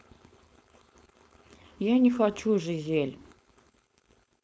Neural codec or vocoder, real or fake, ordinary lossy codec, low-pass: codec, 16 kHz, 4.8 kbps, FACodec; fake; none; none